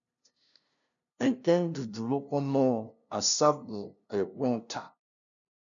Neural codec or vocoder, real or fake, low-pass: codec, 16 kHz, 0.5 kbps, FunCodec, trained on LibriTTS, 25 frames a second; fake; 7.2 kHz